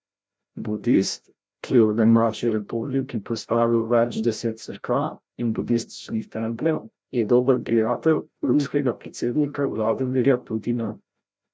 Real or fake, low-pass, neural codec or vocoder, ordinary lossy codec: fake; none; codec, 16 kHz, 0.5 kbps, FreqCodec, larger model; none